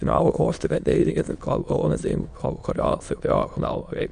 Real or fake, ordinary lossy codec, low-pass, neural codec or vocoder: fake; none; 9.9 kHz; autoencoder, 22.05 kHz, a latent of 192 numbers a frame, VITS, trained on many speakers